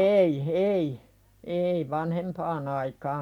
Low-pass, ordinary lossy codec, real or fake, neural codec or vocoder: 19.8 kHz; MP3, 96 kbps; fake; vocoder, 44.1 kHz, 128 mel bands every 256 samples, BigVGAN v2